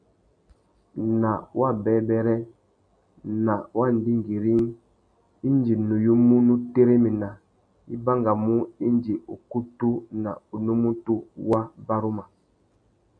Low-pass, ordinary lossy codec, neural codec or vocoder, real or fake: 9.9 kHz; MP3, 64 kbps; none; real